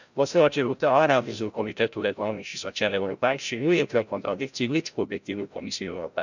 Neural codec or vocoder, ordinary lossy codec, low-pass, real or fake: codec, 16 kHz, 0.5 kbps, FreqCodec, larger model; none; 7.2 kHz; fake